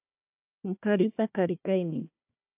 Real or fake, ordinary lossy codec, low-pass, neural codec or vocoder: fake; AAC, 32 kbps; 3.6 kHz; codec, 16 kHz, 1 kbps, FunCodec, trained on Chinese and English, 50 frames a second